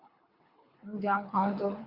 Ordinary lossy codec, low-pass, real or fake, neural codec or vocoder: Opus, 64 kbps; 5.4 kHz; fake; codec, 24 kHz, 6 kbps, HILCodec